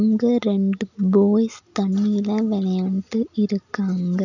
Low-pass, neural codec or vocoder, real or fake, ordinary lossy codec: 7.2 kHz; none; real; none